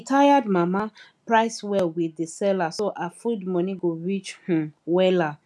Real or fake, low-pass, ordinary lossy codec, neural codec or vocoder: real; none; none; none